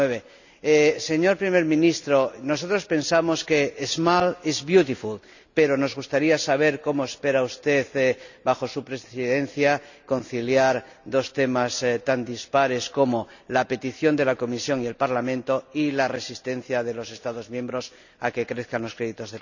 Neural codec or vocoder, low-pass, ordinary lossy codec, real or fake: none; 7.2 kHz; none; real